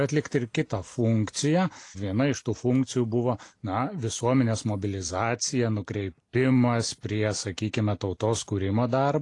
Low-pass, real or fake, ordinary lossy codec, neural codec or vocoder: 10.8 kHz; real; AAC, 48 kbps; none